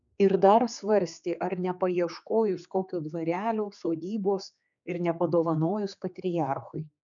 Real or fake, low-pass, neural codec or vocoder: fake; 7.2 kHz; codec, 16 kHz, 4 kbps, X-Codec, HuBERT features, trained on general audio